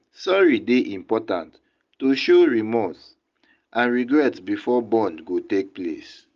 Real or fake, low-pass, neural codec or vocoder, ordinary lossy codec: real; 7.2 kHz; none; Opus, 32 kbps